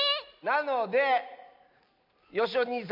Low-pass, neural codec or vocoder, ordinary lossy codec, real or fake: 5.4 kHz; none; MP3, 48 kbps; real